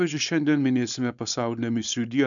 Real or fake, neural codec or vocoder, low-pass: fake; codec, 16 kHz, 4.8 kbps, FACodec; 7.2 kHz